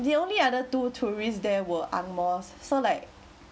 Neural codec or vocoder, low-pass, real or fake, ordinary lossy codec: none; none; real; none